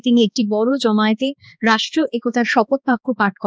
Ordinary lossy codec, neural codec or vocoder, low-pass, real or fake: none; codec, 16 kHz, 2 kbps, X-Codec, HuBERT features, trained on balanced general audio; none; fake